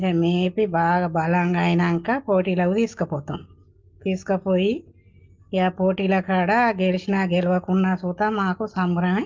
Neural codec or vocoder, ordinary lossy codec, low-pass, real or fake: none; Opus, 24 kbps; 7.2 kHz; real